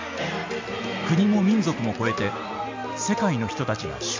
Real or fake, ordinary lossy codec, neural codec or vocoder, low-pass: real; MP3, 64 kbps; none; 7.2 kHz